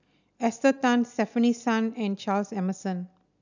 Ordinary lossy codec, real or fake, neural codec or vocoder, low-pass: none; real; none; 7.2 kHz